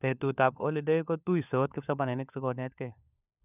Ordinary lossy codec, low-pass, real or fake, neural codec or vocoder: none; 3.6 kHz; fake; codec, 16 kHz, 4 kbps, FunCodec, trained on LibriTTS, 50 frames a second